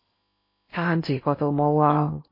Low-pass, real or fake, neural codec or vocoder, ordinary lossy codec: 5.4 kHz; fake; codec, 16 kHz in and 24 kHz out, 0.8 kbps, FocalCodec, streaming, 65536 codes; MP3, 24 kbps